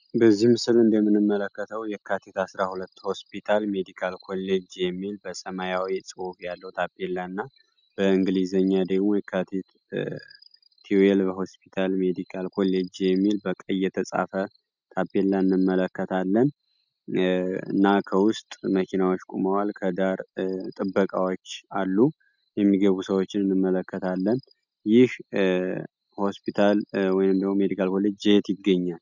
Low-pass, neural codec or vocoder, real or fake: 7.2 kHz; none; real